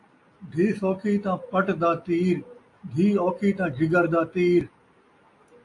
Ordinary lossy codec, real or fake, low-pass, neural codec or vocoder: AAC, 48 kbps; real; 10.8 kHz; none